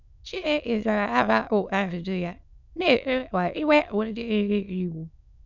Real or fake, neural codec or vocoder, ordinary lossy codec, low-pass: fake; autoencoder, 22.05 kHz, a latent of 192 numbers a frame, VITS, trained on many speakers; none; 7.2 kHz